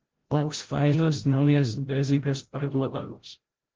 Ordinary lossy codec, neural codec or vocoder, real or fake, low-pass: Opus, 16 kbps; codec, 16 kHz, 0.5 kbps, FreqCodec, larger model; fake; 7.2 kHz